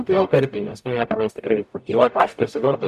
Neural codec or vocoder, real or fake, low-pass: codec, 44.1 kHz, 0.9 kbps, DAC; fake; 14.4 kHz